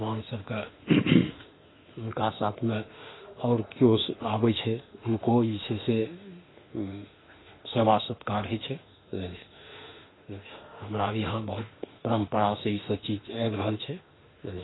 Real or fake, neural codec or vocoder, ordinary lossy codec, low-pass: fake; autoencoder, 48 kHz, 32 numbers a frame, DAC-VAE, trained on Japanese speech; AAC, 16 kbps; 7.2 kHz